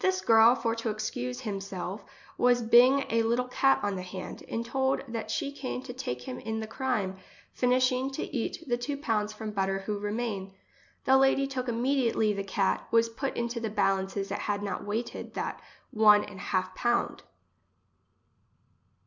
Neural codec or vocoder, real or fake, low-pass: none; real; 7.2 kHz